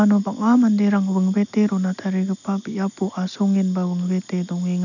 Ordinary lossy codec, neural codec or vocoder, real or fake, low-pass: none; none; real; 7.2 kHz